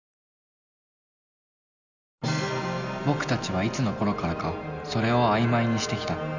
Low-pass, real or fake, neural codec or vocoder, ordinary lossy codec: 7.2 kHz; real; none; none